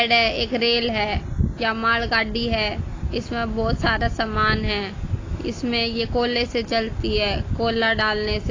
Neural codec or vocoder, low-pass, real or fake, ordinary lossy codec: none; 7.2 kHz; real; AAC, 32 kbps